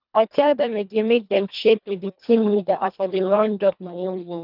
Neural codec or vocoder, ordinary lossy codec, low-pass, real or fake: codec, 24 kHz, 1.5 kbps, HILCodec; none; 5.4 kHz; fake